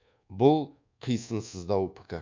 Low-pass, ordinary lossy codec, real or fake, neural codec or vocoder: 7.2 kHz; MP3, 64 kbps; fake; codec, 24 kHz, 1.2 kbps, DualCodec